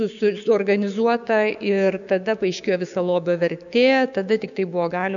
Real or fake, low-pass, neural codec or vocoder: fake; 7.2 kHz; codec, 16 kHz, 6 kbps, DAC